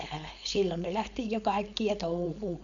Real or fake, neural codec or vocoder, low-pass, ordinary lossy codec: fake; codec, 16 kHz, 4.8 kbps, FACodec; 7.2 kHz; none